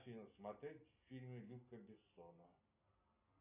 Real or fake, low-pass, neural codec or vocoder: real; 3.6 kHz; none